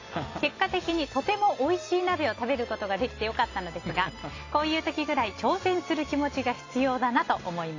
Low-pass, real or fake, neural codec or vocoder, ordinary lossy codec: 7.2 kHz; real; none; AAC, 32 kbps